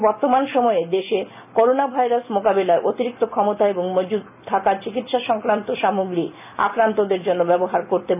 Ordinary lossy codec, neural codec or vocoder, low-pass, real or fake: none; none; 3.6 kHz; real